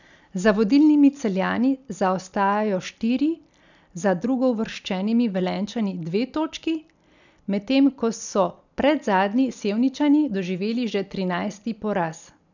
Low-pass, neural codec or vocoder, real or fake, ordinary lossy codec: 7.2 kHz; none; real; none